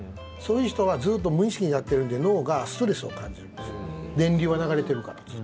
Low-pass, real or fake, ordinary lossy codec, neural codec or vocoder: none; real; none; none